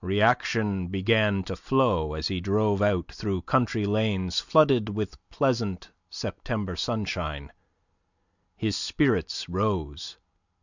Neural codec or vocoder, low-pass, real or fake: none; 7.2 kHz; real